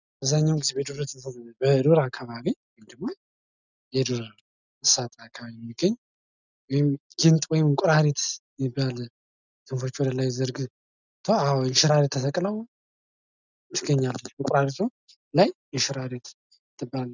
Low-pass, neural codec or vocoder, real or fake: 7.2 kHz; none; real